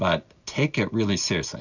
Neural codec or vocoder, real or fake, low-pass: none; real; 7.2 kHz